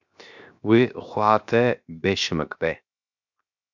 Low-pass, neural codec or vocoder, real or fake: 7.2 kHz; codec, 16 kHz, 0.7 kbps, FocalCodec; fake